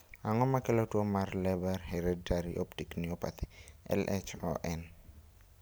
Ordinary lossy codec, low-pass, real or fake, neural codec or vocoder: none; none; real; none